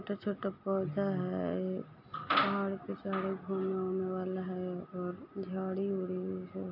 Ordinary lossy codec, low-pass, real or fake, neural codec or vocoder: AAC, 48 kbps; 5.4 kHz; real; none